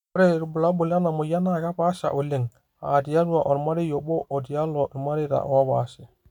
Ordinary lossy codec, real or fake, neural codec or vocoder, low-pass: none; real; none; 19.8 kHz